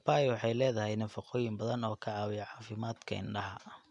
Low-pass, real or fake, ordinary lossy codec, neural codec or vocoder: 10.8 kHz; real; none; none